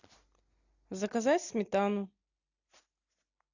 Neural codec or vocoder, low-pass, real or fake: none; 7.2 kHz; real